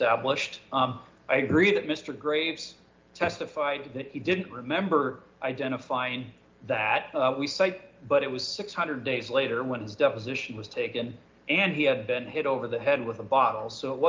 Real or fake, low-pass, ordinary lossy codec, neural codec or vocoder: real; 7.2 kHz; Opus, 32 kbps; none